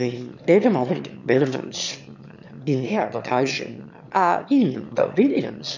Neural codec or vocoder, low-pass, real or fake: autoencoder, 22.05 kHz, a latent of 192 numbers a frame, VITS, trained on one speaker; 7.2 kHz; fake